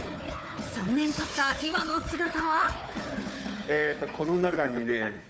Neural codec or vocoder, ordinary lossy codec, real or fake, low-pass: codec, 16 kHz, 4 kbps, FunCodec, trained on Chinese and English, 50 frames a second; none; fake; none